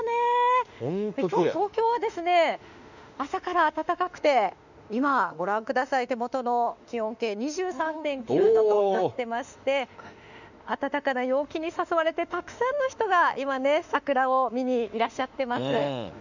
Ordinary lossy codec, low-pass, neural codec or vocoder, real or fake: none; 7.2 kHz; autoencoder, 48 kHz, 32 numbers a frame, DAC-VAE, trained on Japanese speech; fake